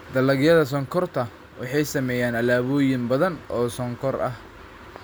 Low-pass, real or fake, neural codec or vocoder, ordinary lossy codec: none; real; none; none